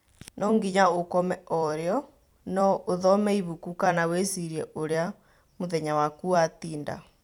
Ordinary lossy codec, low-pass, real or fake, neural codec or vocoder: none; 19.8 kHz; fake; vocoder, 44.1 kHz, 128 mel bands every 256 samples, BigVGAN v2